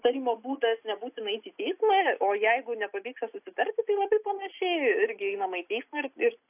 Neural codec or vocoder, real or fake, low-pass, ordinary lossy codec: none; real; 3.6 kHz; AAC, 32 kbps